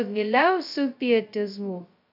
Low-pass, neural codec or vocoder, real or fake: 5.4 kHz; codec, 16 kHz, 0.2 kbps, FocalCodec; fake